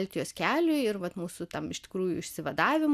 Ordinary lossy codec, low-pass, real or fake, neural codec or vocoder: AAC, 96 kbps; 14.4 kHz; real; none